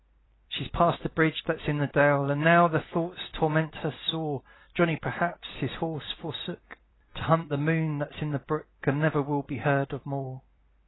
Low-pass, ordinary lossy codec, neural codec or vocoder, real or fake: 7.2 kHz; AAC, 16 kbps; vocoder, 44.1 kHz, 128 mel bands every 256 samples, BigVGAN v2; fake